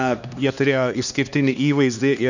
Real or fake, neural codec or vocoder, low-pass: fake; codec, 16 kHz, 2 kbps, X-Codec, HuBERT features, trained on LibriSpeech; 7.2 kHz